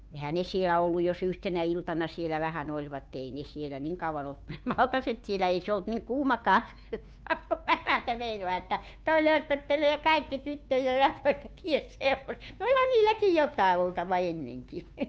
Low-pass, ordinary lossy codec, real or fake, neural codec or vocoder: none; none; fake; codec, 16 kHz, 2 kbps, FunCodec, trained on Chinese and English, 25 frames a second